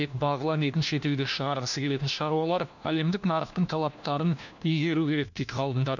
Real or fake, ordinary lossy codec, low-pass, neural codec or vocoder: fake; none; 7.2 kHz; codec, 16 kHz, 1 kbps, FunCodec, trained on LibriTTS, 50 frames a second